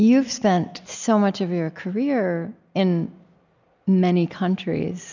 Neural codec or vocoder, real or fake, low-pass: none; real; 7.2 kHz